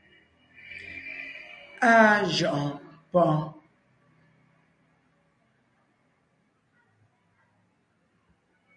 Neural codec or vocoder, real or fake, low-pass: none; real; 9.9 kHz